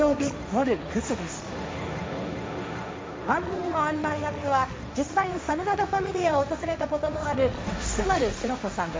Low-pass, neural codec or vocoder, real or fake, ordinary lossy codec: none; codec, 16 kHz, 1.1 kbps, Voila-Tokenizer; fake; none